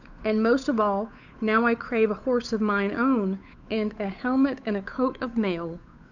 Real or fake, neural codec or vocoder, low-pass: fake; codec, 16 kHz, 8 kbps, FunCodec, trained on Chinese and English, 25 frames a second; 7.2 kHz